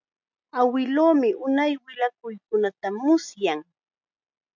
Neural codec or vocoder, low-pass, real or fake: none; 7.2 kHz; real